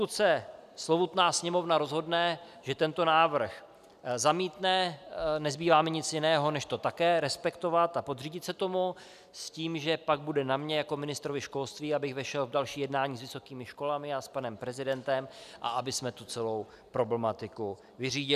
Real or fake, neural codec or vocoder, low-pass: real; none; 14.4 kHz